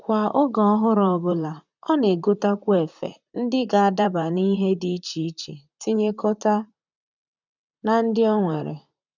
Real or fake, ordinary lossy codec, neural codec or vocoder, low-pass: fake; none; vocoder, 44.1 kHz, 128 mel bands, Pupu-Vocoder; 7.2 kHz